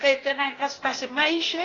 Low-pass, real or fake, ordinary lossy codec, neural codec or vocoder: 7.2 kHz; fake; AAC, 32 kbps; codec, 16 kHz, 0.8 kbps, ZipCodec